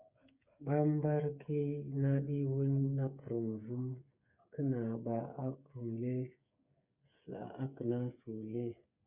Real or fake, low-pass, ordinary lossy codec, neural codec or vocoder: fake; 3.6 kHz; Opus, 64 kbps; codec, 16 kHz, 8 kbps, FreqCodec, smaller model